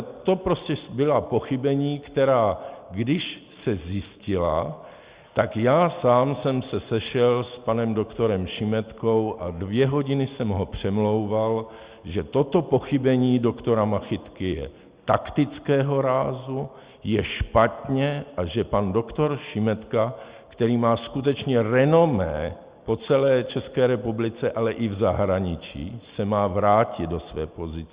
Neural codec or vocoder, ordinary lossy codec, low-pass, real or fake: none; Opus, 64 kbps; 3.6 kHz; real